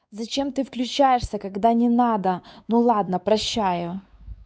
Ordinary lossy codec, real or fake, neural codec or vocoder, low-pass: none; fake; codec, 16 kHz, 4 kbps, X-Codec, WavLM features, trained on Multilingual LibriSpeech; none